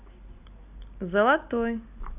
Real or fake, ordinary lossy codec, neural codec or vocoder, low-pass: real; none; none; 3.6 kHz